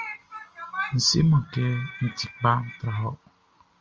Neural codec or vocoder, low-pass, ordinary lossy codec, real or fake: none; 7.2 kHz; Opus, 24 kbps; real